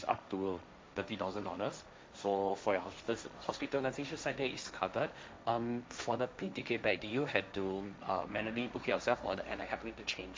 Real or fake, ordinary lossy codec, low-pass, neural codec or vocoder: fake; none; none; codec, 16 kHz, 1.1 kbps, Voila-Tokenizer